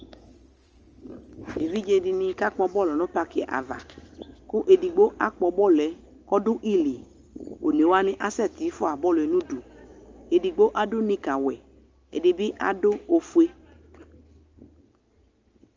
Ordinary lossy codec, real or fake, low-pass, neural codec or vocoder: Opus, 24 kbps; real; 7.2 kHz; none